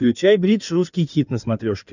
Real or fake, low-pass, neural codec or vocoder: fake; 7.2 kHz; codec, 16 kHz in and 24 kHz out, 2.2 kbps, FireRedTTS-2 codec